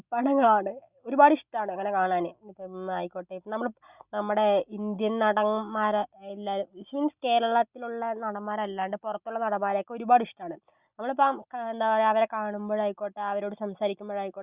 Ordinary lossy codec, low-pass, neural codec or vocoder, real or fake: Opus, 64 kbps; 3.6 kHz; none; real